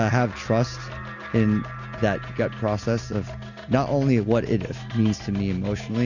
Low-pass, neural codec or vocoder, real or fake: 7.2 kHz; none; real